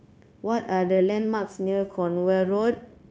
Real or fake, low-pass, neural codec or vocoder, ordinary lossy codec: fake; none; codec, 16 kHz, 0.9 kbps, LongCat-Audio-Codec; none